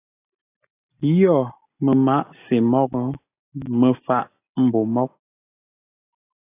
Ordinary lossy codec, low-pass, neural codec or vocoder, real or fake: AAC, 24 kbps; 3.6 kHz; none; real